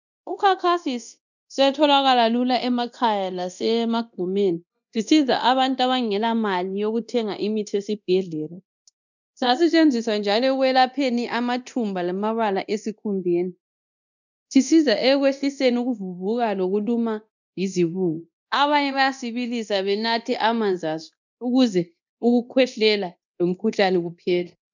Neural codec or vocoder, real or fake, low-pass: codec, 24 kHz, 0.9 kbps, DualCodec; fake; 7.2 kHz